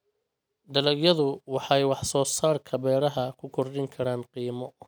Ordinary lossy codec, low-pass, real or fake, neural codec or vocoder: none; none; real; none